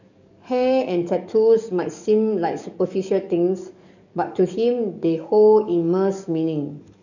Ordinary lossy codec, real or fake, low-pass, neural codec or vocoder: none; fake; 7.2 kHz; codec, 44.1 kHz, 7.8 kbps, DAC